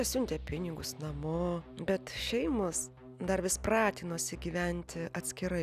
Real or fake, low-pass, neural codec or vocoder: real; 14.4 kHz; none